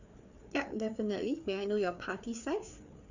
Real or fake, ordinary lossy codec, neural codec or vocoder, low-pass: fake; none; codec, 16 kHz, 4 kbps, FreqCodec, larger model; 7.2 kHz